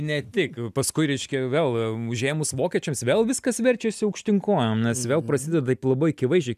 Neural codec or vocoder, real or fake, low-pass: none; real; 14.4 kHz